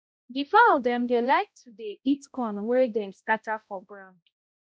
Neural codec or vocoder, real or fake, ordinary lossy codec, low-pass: codec, 16 kHz, 0.5 kbps, X-Codec, HuBERT features, trained on balanced general audio; fake; none; none